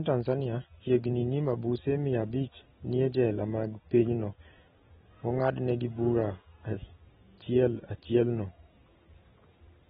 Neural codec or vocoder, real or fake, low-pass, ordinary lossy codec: none; real; 19.8 kHz; AAC, 16 kbps